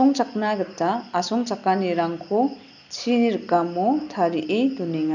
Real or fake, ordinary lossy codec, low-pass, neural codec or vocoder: real; none; 7.2 kHz; none